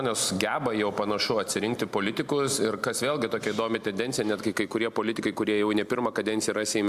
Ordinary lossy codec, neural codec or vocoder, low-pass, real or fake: Opus, 64 kbps; none; 14.4 kHz; real